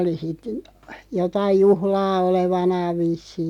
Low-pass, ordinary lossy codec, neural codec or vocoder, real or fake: 19.8 kHz; none; none; real